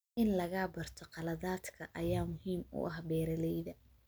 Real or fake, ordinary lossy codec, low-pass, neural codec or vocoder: real; none; none; none